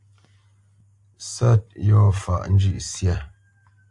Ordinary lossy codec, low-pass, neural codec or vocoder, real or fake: AAC, 64 kbps; 10.8 kHz; none; real